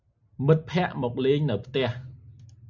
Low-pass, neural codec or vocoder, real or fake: 7.2 kHz; none; real